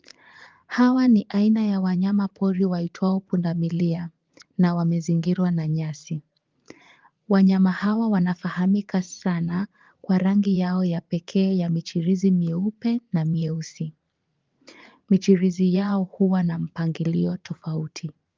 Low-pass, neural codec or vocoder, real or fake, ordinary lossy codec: 7.2 kHz; vocoder, 44.1 kHz, 80 mel bands, Vocos; fake; Opus, 32 kbps